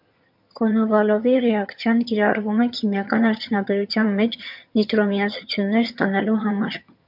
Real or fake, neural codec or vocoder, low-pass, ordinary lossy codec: fake; vocoder, 22.05 kHz, 80 mel bands, HiFi-GAN; 5.4 kHz; MP3, 48 kbps